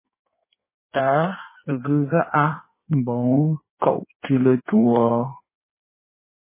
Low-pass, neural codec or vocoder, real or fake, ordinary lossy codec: 3.6 kHz; codec, 16 kHz in and 24 kHz out, 1.1 kbps, FireRedTTS-2 codec; fake; MP3, 16 kbps